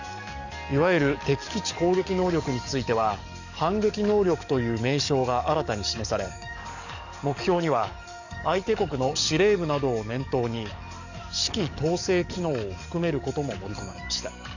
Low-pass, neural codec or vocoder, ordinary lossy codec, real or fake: 7.2 kHz; codec, 44.1 kHz, 7.8 kbps, DAC; none; fake